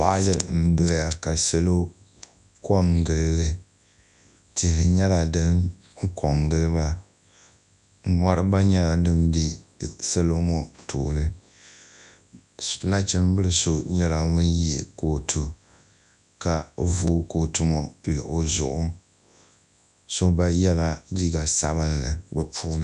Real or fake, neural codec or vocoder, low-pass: fake; codec, 24 kHz, 0.9 kbps, WavTokenizer, large speech release; 10.8 kHz